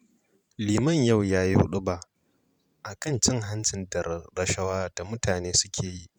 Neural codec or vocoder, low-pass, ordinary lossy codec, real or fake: vocoder, 44.1 kHz, 128 mel bands every 512 samples, BigVGAN v2; 19.8 kHz; none; fake